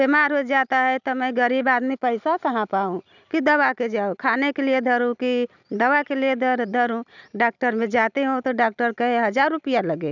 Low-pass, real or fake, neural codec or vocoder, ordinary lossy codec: 7.2 kHz; real; none; none